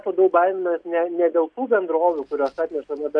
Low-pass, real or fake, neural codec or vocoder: 9.9 kHz; real; none